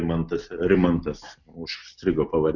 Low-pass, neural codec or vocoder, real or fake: 7.2 kHz; none; real